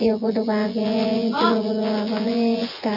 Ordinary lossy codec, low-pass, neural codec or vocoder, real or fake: none; 5.4 kHz; vocoder, 24 kHz, 100 mel bands, Vocos; fake